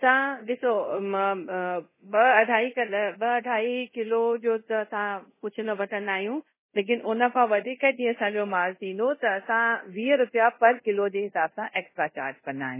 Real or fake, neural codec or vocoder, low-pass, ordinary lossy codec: fake; codec, 24 kHz, 0.5 kbps, DualCodec; 3.6 kHz; MP3, 16 kbps